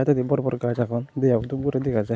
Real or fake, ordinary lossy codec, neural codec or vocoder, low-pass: real; none; none; none